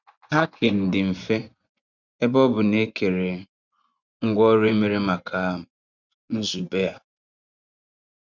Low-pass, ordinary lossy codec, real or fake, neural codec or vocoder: 7.2 kHz; none; fake; vocoder, 24 kHz, 100 mel bands, Vocos